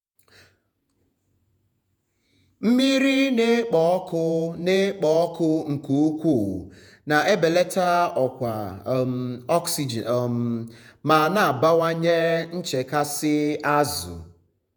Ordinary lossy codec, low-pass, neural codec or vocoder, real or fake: none; 19.8 kHz; vocoder, 48 kHz, 128 mel bands, Vocos; fake